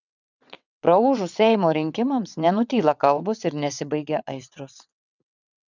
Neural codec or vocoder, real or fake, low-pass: vocoder, 22.05 kHz, 80 mel bands, WaveNeXt; fake; 7.2 kHz